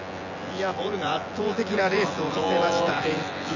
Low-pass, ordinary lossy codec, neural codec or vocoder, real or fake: 7.2 kHz; none; vocoder, 24 kHz, 100 mel bands, Vocos; fake